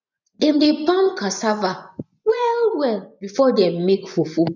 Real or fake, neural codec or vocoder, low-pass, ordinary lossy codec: fake; vocoder, 24 kHz, 100 mel bands, Vocos; 7.2 kHz; none